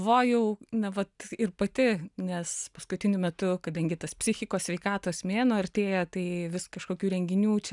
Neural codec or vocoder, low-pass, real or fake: none; 10.8 kHz; real